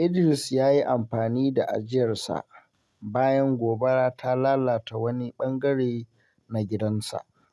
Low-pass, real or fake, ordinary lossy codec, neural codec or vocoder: none; real; none; none